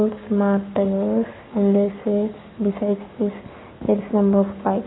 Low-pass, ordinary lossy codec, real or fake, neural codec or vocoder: 7.2 kHz; AAC, 16 kbps; fake; codec, 44.1 kHz, 7.8 kbps, Pupu-Codec